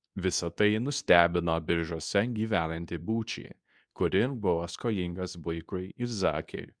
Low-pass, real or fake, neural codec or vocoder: 9.9 kHz; fake; codec, 24 kHz, 0.9 kbps, WavTokenizer, medium speech release version 2